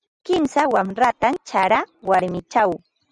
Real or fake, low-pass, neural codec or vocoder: real; 10.8 kHz; none